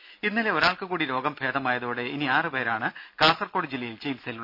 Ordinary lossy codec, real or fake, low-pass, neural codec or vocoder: AAC, 48 kbps; real; 5.4 kHz; none